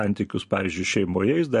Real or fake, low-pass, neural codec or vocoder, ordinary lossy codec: real; 14.4 kHz; none; MP3, 48 kbps